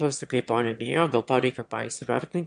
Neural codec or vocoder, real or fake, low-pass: autoencoder, 22.05 kHz, a latent of 192 numbers a frame, VITS, trained on one speaker; fake; 9.9 kHz